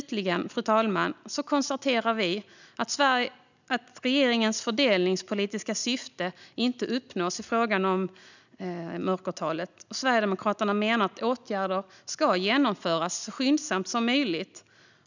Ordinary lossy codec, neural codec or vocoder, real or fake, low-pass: none; none; real; 7.2 kHz